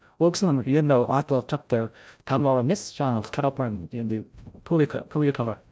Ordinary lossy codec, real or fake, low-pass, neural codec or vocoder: none; fake; none; codec, 16 kHz, 0.5 kbps, FreqCodec, larger model